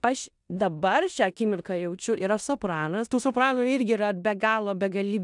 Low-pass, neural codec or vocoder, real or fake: 10.8 kHz; codec, 16 kHz in and 24 kHz out, 0.9 kbps, LongCat-Audio-Codec, four codebook decoder; fake